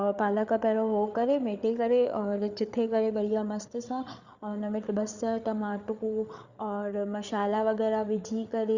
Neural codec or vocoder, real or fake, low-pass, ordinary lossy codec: codec, 16 kHz, 8 kbps, FreqCodec, larger model; fake; 7.2 kHz; Opus, 64 kbps